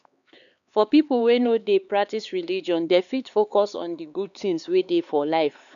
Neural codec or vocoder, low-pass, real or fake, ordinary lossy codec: codec, 16 kHz, 4 kbps, X-Codec, HuBERT features, trained on LibriSpeech; 7.2 kHz; fake; none